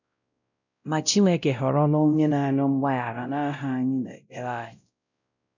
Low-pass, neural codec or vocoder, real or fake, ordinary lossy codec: 7.2 kHz; codec, 16 kHz, 0.5 kbps, X-Codec, WavLM features, trained on Multilingual LibriSpeech; fake; none